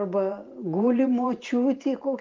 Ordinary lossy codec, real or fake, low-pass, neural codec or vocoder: Opus, 32 kbps; fake; 7.2 kHz; vocoder, 44.1 kHz, 128 mel bands every 512 samples, BigVGAN v2